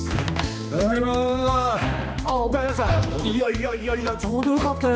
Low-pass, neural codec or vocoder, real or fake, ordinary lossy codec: none; codec, 16 kHz, 2 kbps, X-Codec, HuBERT features, trained on balanced general audio; fake; none